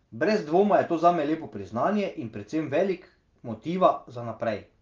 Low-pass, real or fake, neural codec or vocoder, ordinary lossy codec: 7.2 kHz; real; none; Opus, 32 kbps